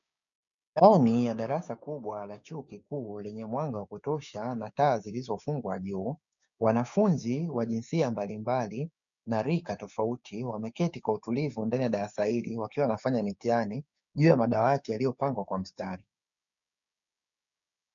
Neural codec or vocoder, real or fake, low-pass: codec, 16 kHz, 6 kbps, DAC; fake; 7.2 kHz